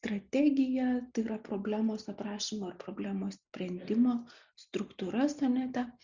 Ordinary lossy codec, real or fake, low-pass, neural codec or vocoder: Opus, 64 kbps; real; 7.2 kHz; none